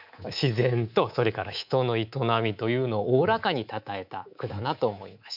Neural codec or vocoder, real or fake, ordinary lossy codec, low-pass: codec, 24 kHz, 3.1 kbps, DualCodec; fake; none; 5.4 kHz